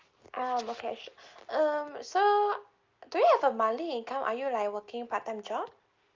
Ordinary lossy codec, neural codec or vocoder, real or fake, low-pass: Opus, 24 kbps; none; real; 7.2 kHz